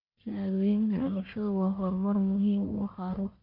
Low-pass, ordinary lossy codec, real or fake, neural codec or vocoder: 5.4 kHz; none; fake; codec, 44.1 kHz, 1.7 kbps, Pupu-Codec